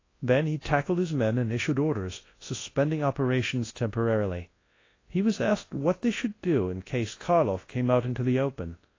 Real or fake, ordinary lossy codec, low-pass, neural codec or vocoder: fake; AAC, 32 kbps; 7.2 kHz; codec, 24 kHz, 0.9 kbps, WavTokenizer, large speech release